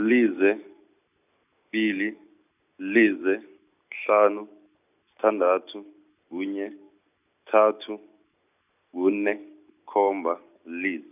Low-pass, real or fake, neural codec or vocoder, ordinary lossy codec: 3.6 kHz; real; none; none